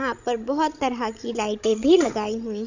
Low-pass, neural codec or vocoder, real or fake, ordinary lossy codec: 7.2 kHz; codec, 16 kHz, 16 kbps, FreqCodec, larger model; fake; none